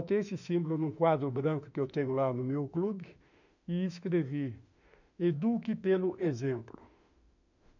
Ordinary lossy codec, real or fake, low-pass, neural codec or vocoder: none; fake; 7.2 kHz; autoencoder, 48 kHz, 32 numbers a frame, DAC-VAE, trained on Japanese speech